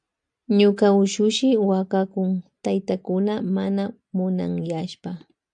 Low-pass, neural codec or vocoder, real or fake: 9.9 kHz; none; real